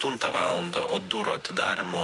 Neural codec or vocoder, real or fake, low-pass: autoencoder, 48 kHz, 32 numbers a frame, DAC-VAE, trained on Japanese speech; fake; 10.8 kHz